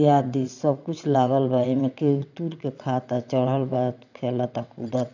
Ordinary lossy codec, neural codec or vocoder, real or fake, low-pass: none; vocoder, 22.05 kHz, 80 mel bands, WaveNeXt; fake; 7.2 kHz